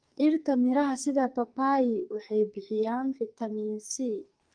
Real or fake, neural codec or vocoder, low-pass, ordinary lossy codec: fake; codec, 44.1 kHz, 2.6 kbps, SNAC; 9.9 kHz; Opus, 32 kbps